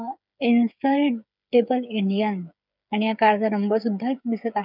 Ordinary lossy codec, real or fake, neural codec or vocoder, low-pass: none; fake; codec, 16 kHz, 8 kbps, FreqCodec, smaller model; 5.4 kHz